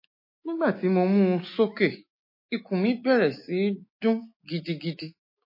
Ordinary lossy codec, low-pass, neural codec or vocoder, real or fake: MP3, 24 kbps; 5.4 kHz; autoencoder, 48 kHz, 128 numbers a frame, DAC-VAE, trained on Japanese speech; fake